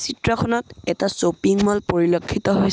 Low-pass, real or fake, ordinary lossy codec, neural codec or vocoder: none; real; none; none